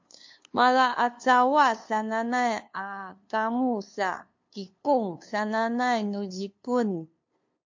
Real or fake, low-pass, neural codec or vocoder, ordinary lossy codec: fake; 7.2 kHz; codec, 16 kHz, 2 kbps, FunCodec, trained on LibriTTS, 25 frames a second; MP3, 48 kbps